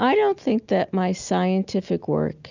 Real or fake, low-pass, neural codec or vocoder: real; 7.2 kHz; none